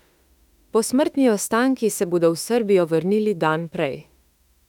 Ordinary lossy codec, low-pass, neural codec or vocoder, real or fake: none; 19.8 kHz; autoencoder, 48 kHz, 32 numbers a frame, DAC-VAE, trained on Japanese speech; fake